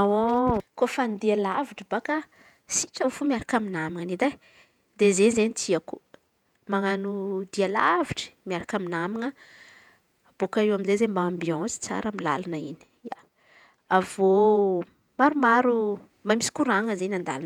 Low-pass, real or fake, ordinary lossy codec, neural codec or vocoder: 19.8 kHz; fake; none; vocoder, 48 kHz, 128 mel bands, Vocos